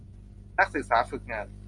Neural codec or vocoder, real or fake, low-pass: none; real; 10.8 kHz